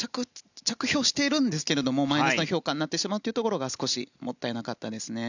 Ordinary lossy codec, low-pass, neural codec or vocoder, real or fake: none; 7.2 kHz; none; real